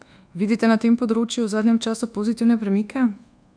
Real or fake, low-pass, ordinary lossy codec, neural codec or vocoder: fake; 9.9 kHz; none; codec, 24 kHz, 1.2 kbps, DualCodec